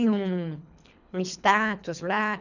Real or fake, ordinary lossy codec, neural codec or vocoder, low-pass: fake; none; codec, 24 kHz, 3 kbps, HILCodec; 7.2 kHz